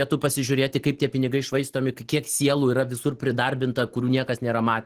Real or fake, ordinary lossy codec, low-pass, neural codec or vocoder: real; Opus, 16 kbps; 14.4 kHz; none